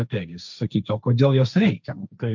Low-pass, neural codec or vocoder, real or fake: 7.2 kHz; codec, 16 kHz, 1.1 kbps, Voila-Tokenizer; fake